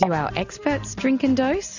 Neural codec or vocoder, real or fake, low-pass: none; real; 7.2 kHz